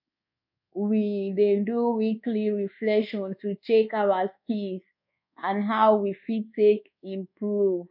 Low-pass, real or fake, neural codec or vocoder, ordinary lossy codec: 5.4 kHz; fake; codec, 24 kHz, 1.2 kbps, DualCodec; MP3, 48 kbps